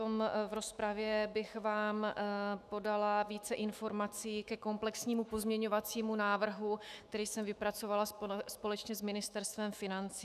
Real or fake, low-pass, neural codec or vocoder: fake; 14.4 kHz; autoencoder, 48 kHz, 128 numbers a frame, DAC-VAE, trained on Japanese speech